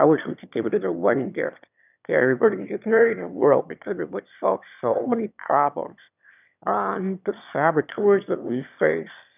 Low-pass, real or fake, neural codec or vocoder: 3.6 kHz; fake; autoencoder, 22.05 kHz, a latent of 192 numbers a frame, VITS, trained on one speaker